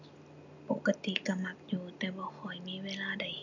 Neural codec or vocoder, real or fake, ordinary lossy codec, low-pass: none; real; none; 7.2 kHz